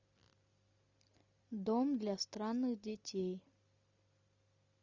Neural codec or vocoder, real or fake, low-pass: none; real; 7.2 kHz